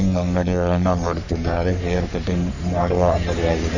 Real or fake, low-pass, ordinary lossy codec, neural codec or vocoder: fake; 7.2 kHz; none; codec, 44.1 kHz, 3.4 kbps, Pupu-Codec